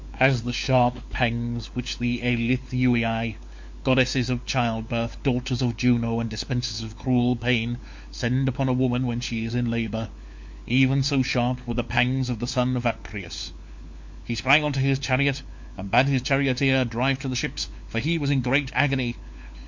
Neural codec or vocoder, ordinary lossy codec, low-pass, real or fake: codec, 16 kHz, 4 kbps, FunCodec, trained on LibriTTS, 50 frames a second; MP3, 48 kbps; 7.2 kHz; fake